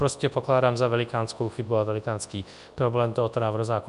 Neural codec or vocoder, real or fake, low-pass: codec, 24 kHz, 0.9 kbps, WavTokenizer, large speech release; fake; 10.8 kHz